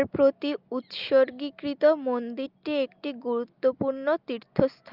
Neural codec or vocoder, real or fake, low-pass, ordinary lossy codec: none; real; 5.4 kHz; Opus, 24 kbps